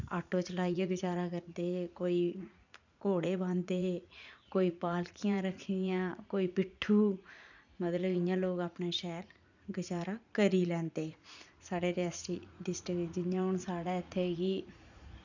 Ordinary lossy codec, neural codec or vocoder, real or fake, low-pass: none; vocoder, 44.1 kHz, 80 mel bands, Vocos; fake; 7.2 kHz